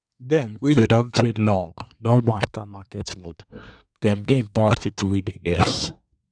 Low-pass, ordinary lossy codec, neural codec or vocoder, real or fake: 9.9 kHz; none; codec, 24 kHz, 1 kbps, SNAC; fake